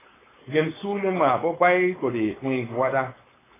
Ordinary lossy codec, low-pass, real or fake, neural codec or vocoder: AAC, 16 kbps; 3.6 kHz; fake; codec, 16 kHz, 4.8 kbps, FACodec